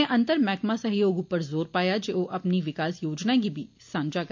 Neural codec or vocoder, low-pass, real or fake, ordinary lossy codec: none; 7.2 kHz; real; MP3, 48 kbps